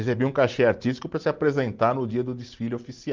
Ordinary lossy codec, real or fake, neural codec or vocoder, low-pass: Opus, 32 kbps; real; none; 7.2 kHz